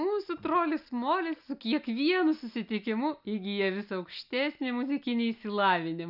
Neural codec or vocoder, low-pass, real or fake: none; 5.4 kHz; real